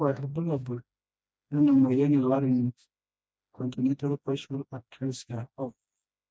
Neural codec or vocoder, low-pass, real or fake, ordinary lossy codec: codec, 16 kHz, 1 kbps, FreqCodec, smaller model; none; fake; none